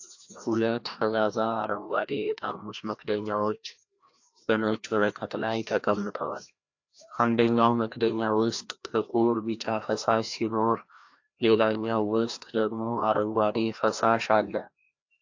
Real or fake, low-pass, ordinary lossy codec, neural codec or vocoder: fake; 7.2 kHz; AAC, 48 kbps; codec, 16 kHz, 1 kbps, FreqCodec, larger model